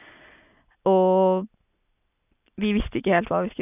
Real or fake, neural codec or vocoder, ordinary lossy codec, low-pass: real; none; none; 3.6 kHz